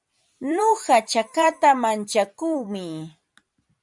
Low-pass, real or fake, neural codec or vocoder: 10.8 kHz; fake; vocoder, 44.1 kHz, 128 mel bands every 256 samples, BigVGAN v2